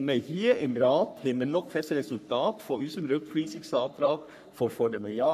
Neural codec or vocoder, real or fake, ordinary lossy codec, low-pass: codec, 44.1 kHz, 3.4 kbps, Pupu-Codec; fake; none; 14.4 kHz